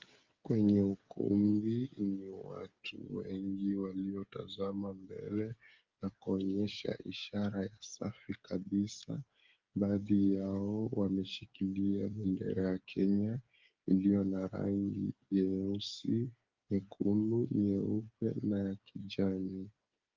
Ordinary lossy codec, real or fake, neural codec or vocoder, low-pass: Opus, 32 kbps; fake; codec, 16 kHz, 8 kbps, FreqCodec, smaller model; 7.2 kHz